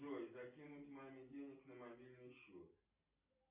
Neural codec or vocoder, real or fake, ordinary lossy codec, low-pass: none; real; AAC, 32 kbps; 3.6 kHz